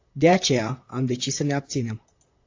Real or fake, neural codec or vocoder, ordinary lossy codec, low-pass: fake; vocoder, 44.1 kHz, 128 mel bands, Pupu-Vocoder; AAC, 48 kbps; 7.2 kHz